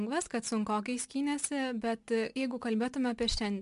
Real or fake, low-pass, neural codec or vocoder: real; 10.8 kHz; none